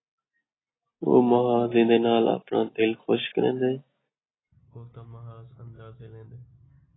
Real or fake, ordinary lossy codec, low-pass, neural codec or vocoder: real; AAC, 16 kbps; 7.2 kHz; none